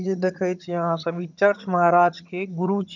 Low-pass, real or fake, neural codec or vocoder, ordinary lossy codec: 7.2 kHz; fake; vocoder, 22.05 kHz, 80 mel bands, HiFi-GAN; none